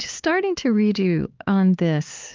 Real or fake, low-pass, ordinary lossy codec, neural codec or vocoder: fake; 7.2 kHz; Opus, 32 kbps; codec, 16 kHz, 4 kbps, X-Codec, HuBERT features, trained on LibriSpeech